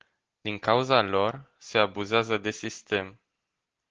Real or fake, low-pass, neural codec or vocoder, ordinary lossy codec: real; 7.2 kHz; none; Opus, 16 kbps